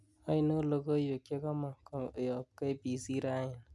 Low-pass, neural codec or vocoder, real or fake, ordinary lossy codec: none; none; real; none